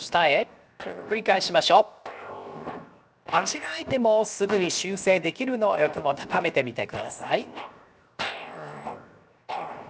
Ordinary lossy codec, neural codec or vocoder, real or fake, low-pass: none; codec, 16 kHz, 0.7 kbps, FocalCodec; fake; none